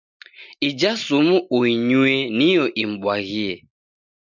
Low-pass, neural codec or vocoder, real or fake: 7.2 kHz; none; real